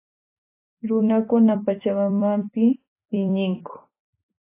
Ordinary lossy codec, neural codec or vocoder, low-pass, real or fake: AAC, 24 kbps; vocoder, 44.1 kHz, 128 mel bands every 256 samples, BigVGAN v2; 3.6 kHz; fake